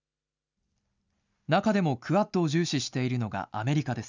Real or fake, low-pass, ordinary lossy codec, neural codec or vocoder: real; 7.2 kHz; none; none